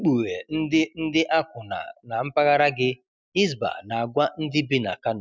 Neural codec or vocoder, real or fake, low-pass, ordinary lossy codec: none; real; none; none